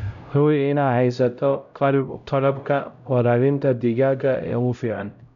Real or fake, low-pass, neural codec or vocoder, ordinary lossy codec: fake; 7.2 kHz; codec, 16 kHz, 0.5 kbps, X-Codec, HuBERT features, trained on LibriSpeech; MP3, 96 kbps